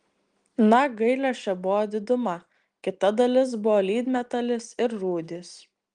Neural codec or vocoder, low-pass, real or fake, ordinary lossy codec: none; 9.9 kHz; real; Opus, 24 kbps